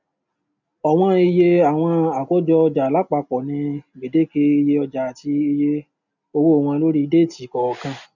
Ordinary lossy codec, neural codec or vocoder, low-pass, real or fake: none; none; 7.2 kHz; real